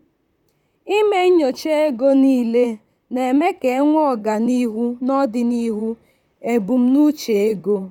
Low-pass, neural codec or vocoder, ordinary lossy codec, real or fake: 19.8 kHz; vocoder, 44.1 kHz, 128 mel bands, Pupu-Vocoder; none; fake